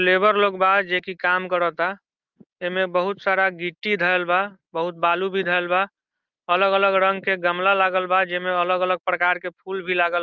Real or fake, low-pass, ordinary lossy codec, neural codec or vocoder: real; 7.2 kHz; Opus, 24 kbps; none